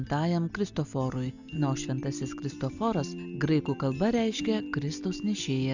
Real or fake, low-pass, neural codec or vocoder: real; 7.2 kHz; none